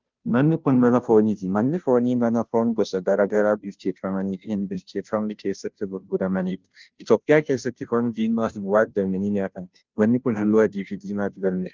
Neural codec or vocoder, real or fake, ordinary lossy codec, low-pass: codec, 16 kHz, 0.5 kbps, FunCodec, trained on Chinese and English, 25 frames a second; fake; Opus, 24 kbps; 7.2 kHz